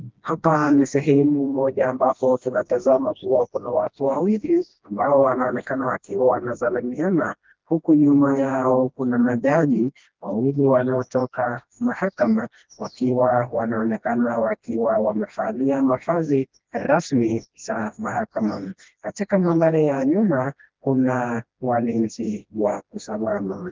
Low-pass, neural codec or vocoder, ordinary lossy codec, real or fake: 7.2 kHz; codec, 16 kHz, 1 kbps, FreqCodec, smaller model; Opus, 32 kbps; fake